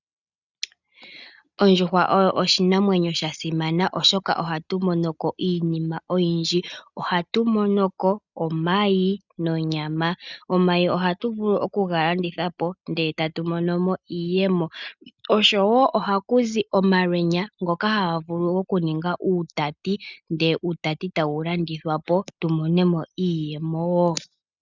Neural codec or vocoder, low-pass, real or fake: none; 7.2 kHz; real